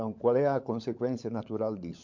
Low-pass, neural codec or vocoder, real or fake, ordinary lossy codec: 7.2 kHz; codec, 16 kHz, 16 kbps, FunCodec, trained on LibriTTS, 50 frames a second; fake; MP3, 48 kbps